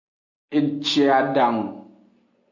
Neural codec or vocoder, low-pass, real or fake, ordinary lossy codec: none; 7.2 kHz; real; MP3, 64 kbps